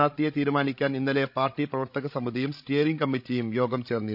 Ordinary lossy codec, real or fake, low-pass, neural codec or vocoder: none; fake; 5.4 kHz; codec, 16 kHz, 16 kbps, FreqCodec, larger model